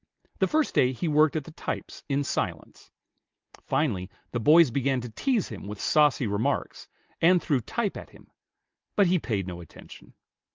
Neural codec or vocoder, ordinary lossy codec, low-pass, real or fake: none; Opus, 24 kbps; 7.2 kHz; real